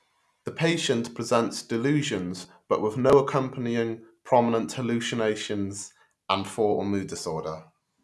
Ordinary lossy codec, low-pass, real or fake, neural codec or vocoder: none; none; real; none